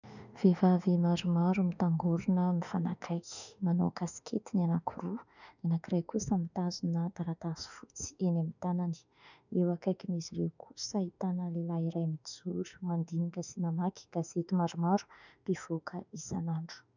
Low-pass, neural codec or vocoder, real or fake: 7.2 kHz; autoencoder, 48 kHz, 32 numbers a frame, DAC-VAE, trained on Japanese speech; fake